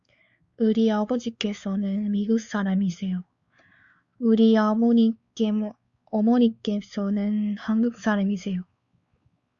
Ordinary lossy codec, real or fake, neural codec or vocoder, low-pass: Opus, 64 kbps; fake; codec, 16 kHz, 4 kbps, X-Codec, WavLM features, trained on Multilingual LibriSpeech; 7.2 kHz